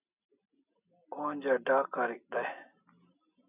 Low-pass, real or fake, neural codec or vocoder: 3.6 kHz; real; none